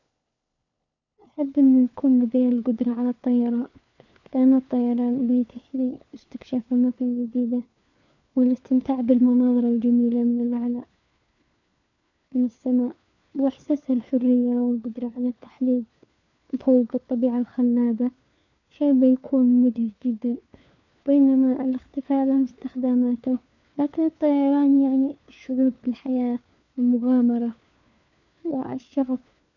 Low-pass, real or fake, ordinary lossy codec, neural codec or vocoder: 7.2 kHz; fake; none; codec, 16 kHz, 4 kbps, FunCodec, trained on LibriTTS, 50 frames a second